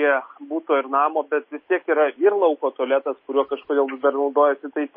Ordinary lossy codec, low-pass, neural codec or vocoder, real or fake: MP3, 24 kbps; 5.4 kHz; none; real